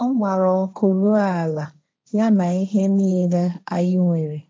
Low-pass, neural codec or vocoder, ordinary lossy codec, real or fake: none; codec, 16 kHz, 1.1 kbps, Voila-Tokenizer; none; fake